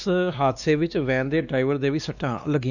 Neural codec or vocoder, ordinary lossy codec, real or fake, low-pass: codec, 16 kHz, 2 kbps, X-Codec, WavLM features, trained on Multilingual LibriSpeech; none; fake; 7.2 kHz